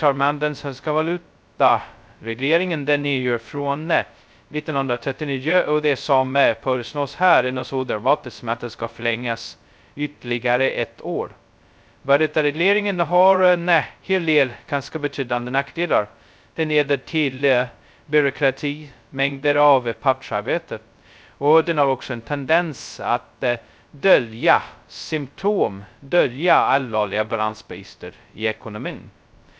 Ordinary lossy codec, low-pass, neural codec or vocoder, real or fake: none; none; codec, 16 kHz, 0.2 kbps, FocalCodec; fake